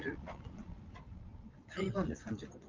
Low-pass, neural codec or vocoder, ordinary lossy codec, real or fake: 7.2 kHz; vocoder, 22.05 kHz, 80 mel bands, Vocos; Opus, 24 kbps; fake